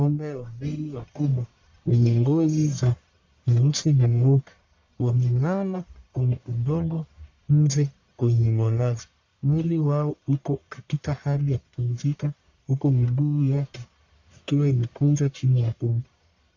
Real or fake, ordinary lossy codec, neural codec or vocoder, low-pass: fake; AAC, 48 kbps; codec, 44.1 kHz, 1.7 kbps, Pupu-Codec; 7.2 kHz